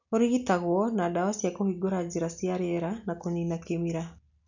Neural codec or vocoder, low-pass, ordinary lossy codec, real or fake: none; 7.2 kHz; none; real